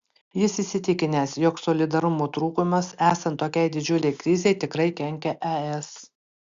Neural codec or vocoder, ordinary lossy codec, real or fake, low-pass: none; Opus, 64 kbps; real; 7.2 kHz